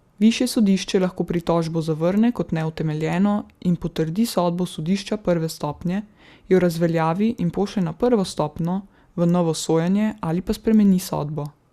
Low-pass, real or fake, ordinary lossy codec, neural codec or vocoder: 14.4 kHz; real; Opus, 64 kbps; none